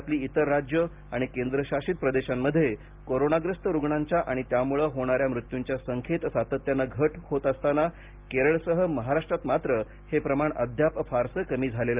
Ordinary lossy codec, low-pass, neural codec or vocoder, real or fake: Opus, 24 kbps; 3.6 kHz; none; real